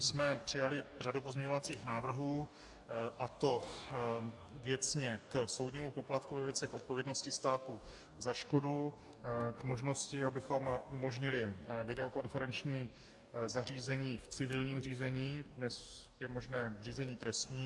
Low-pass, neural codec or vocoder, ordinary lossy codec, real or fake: 10.8 kHz; codec, 44.1 kHz, 2.6 kbps, DAC; AAC, 64 kbps; fake